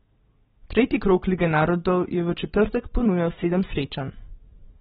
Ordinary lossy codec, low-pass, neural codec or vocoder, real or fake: AAC, 16 kbps; 19.8 kHz; none; real